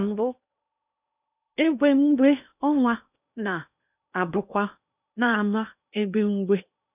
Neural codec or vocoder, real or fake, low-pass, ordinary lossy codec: codec, 16 kHz in and 24 kHz out, 0.8 kbps, FocalCodec, streaming, 65536 codes; fake; 3.6 kHz; none